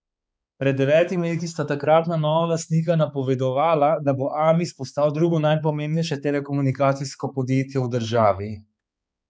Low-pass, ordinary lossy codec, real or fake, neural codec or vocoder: none; none; fake; codec, 16 kHz, 4 kbps, X-Codec, HuBERT features, trained on balanced general audio